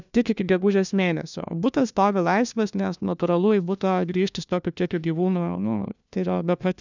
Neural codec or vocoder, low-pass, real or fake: codec, 16 kHz, 1 kbps, FunCodec, trained on LibriTTS, 50 frames a second; 7.2 kHz; fake